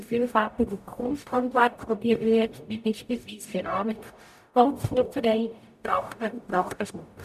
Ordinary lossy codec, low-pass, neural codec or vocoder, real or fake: none; 14.4 kHz; codec, 44.1 kHz, 0.9 kbps, DAC; fake